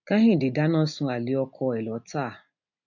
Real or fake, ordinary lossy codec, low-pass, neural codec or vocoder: real; none; 7.2 kHz; none